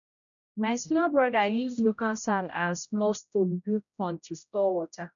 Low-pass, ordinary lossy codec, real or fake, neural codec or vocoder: 7.2 kHz; none; fake; codec, 16 kHz, 0.5 kbps, X-Codec, HuBERT features, trained on general audio